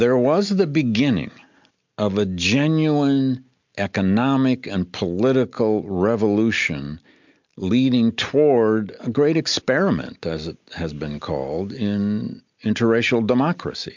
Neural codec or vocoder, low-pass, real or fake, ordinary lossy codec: none; 7.2 kHz; real; MP3, 64 kbps